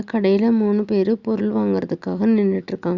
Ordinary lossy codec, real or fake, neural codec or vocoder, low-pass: Opus, 64 kbps; real; none; 7.2 kHz